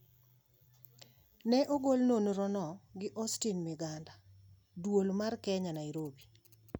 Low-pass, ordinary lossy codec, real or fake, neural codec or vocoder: none; none; real; none